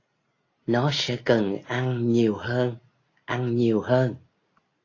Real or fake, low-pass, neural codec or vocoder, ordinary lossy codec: real; 7.2 kHz; none; AAC, 32 kbps